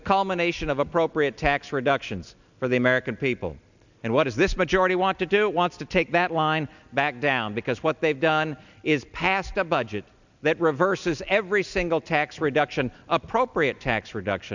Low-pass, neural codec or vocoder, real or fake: 7.2 kHz; none; real